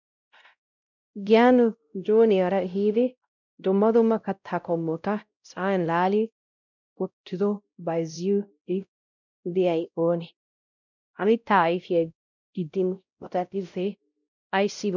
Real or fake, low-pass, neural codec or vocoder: fake; 7.2 kHz; codec, 16 kHz, 0.5 kbps, X-Codec, WavLM features, trained on Multilingual LibriSpeech